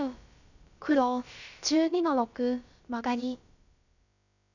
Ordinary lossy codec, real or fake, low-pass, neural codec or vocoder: none; fake; 7.2 kHz; codec, 16 kHz, about 1 kbps, DyCAST, with the encoder's durations